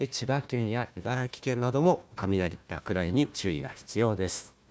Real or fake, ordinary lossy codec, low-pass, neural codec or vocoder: fake; none; none; codec, 16 kHz, 1 kbps, FunCodec, trained on Chinese and English, 50 frames a second